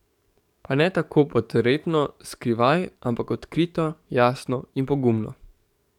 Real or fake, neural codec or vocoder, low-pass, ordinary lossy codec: fake; codec, 44.1 kHz, 7.8 kbps, DAC; 19.8 kHz; none